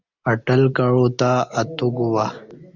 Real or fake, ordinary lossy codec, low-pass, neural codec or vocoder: real; Opus, 64 kbps; 7.2 kHz; none